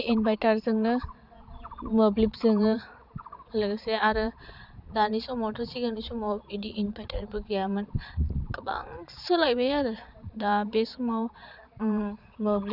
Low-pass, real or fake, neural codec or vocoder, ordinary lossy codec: 5.4 kHz; fake; vocoder, 22.05 kHz, 80 mel bands, Vocos; Opus, 64 kbps